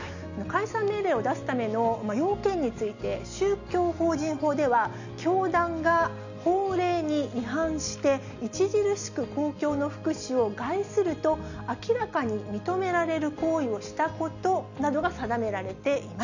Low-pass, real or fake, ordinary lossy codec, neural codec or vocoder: 7.2 kHz; real; none; none